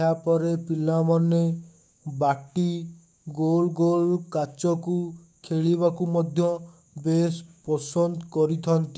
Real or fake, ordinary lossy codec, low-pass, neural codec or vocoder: fake; none; none; codec, 16 kHz, 6 kbps, DAC